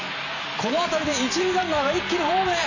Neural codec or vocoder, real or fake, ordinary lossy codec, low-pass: vocoder, 44.1 kHz, 128 mel bands every 512 samples, BigVGAN v2; fake; none; 7.2 kHz